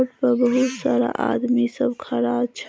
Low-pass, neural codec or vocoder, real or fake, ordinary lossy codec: none; none; real; none